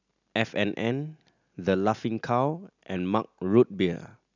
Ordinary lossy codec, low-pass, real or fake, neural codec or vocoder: none; 7.2 kHz; real; none